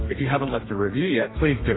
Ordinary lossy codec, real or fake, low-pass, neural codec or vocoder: AAC, 16 kbps; fake; 7.2 kHz; codec, 32 kHz, 1.9 kbps, SNAC